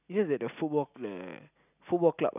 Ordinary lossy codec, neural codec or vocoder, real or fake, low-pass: none; none; real; 3.6 kHz